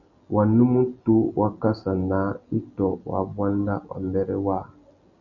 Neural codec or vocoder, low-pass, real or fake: none; 7.2 kHz; real